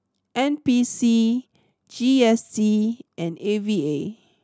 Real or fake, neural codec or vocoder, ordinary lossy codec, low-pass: real; none; none; none